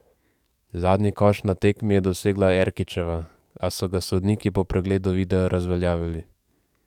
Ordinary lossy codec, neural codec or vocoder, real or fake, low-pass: none; codec, 44.1 kHz, 7.8 kbps, DAC; fake; 19.8 kHz